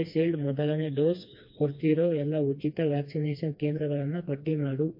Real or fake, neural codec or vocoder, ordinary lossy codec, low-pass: fake; codec, 16 kHz, 2 kbps, FreqCodec, smaller model; MP3, 32 kbps; 5.4 kHz